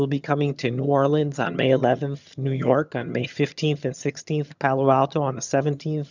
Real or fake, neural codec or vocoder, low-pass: fake; vocoder, 22.05 kHz, 80 mel bands, HiFi-GAN; 7.2 kHz